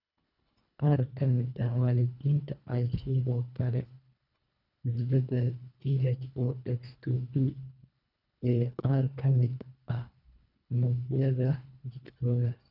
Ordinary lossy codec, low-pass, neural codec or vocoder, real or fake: none; 5.4 kHz; codec, 24 kHz, 1.5 kbps, HILCodec; fake